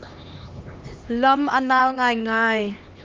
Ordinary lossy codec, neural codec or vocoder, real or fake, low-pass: Opus, 24 kbps; codec, 16 kHz, 2 kbps, X-Codec, HuBERT features, trained on LibriSpeech; fake; 7.2 kHz